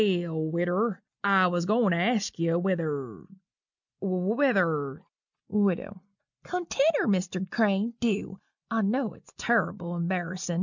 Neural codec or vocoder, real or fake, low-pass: none; real; 7.2 kHz